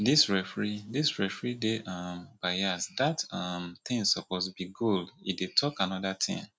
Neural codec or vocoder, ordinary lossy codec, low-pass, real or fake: none; none; none; real